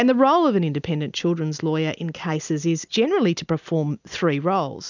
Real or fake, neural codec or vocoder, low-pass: real; none; 7.2 kHz